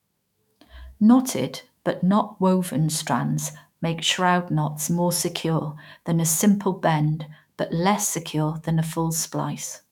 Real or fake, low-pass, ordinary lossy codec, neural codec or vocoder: fake; 19.8 kHz; none; autoencoder, 48 kHz, 128 numbers a frame, DAC-VAE, trained on Japanese speech